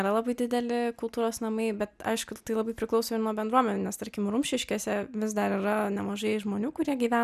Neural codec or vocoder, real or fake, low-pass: none; real; 14.4 kHz